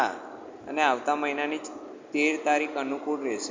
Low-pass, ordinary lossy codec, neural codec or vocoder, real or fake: 7.2 kHz; MP3, 48 kbps; none; real